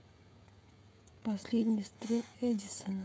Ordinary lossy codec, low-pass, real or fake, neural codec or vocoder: none; none; fake; codec, 16 kHz, 16 kbps, FreqCodec, smaller model